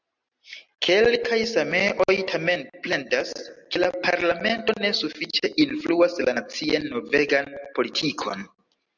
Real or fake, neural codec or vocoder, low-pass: real; none; 7.2 kHz